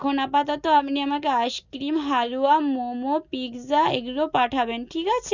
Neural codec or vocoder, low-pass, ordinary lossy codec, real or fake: none; 7.2 kHz; none; real